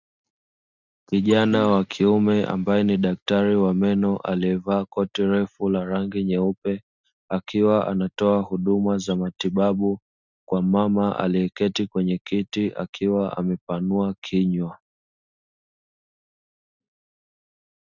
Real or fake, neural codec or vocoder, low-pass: real; none; 7.2 kHz